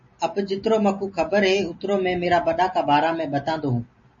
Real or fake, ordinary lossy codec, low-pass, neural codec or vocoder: real; MP3, 32 kbps; 7.2 kHz; none